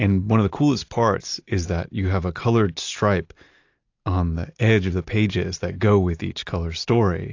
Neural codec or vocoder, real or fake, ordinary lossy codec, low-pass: none; real; AAC, 48 kbps; 7.2 kHz